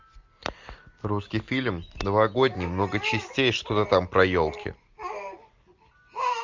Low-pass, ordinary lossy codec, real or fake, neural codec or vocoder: 7.2 kHz; MP3, 64 kbps; real; none